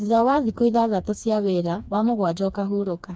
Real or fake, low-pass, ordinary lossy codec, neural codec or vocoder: fake; none; none; codec, 16 kHz, 2 kbps, FreqCodec, smaller model